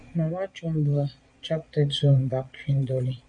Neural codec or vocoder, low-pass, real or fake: vocoder, 22.05 kHz, 80 mel bands, Vocos; 9.9 kHz; fake